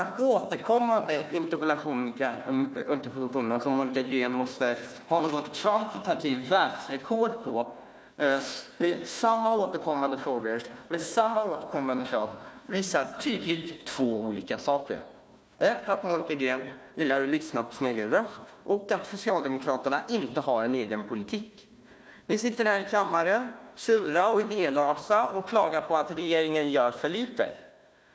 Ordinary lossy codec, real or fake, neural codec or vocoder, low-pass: none; fake; codec, 16 kHz, 1 kbps, FunCodec, trained on Chinese and English, 50 frames a second; none